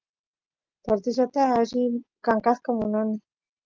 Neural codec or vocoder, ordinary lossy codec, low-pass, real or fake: none; Opus, 24 kbps; 7.2 kHz; real